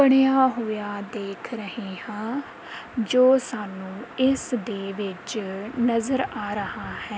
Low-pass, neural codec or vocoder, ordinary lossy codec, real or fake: none; none; none; real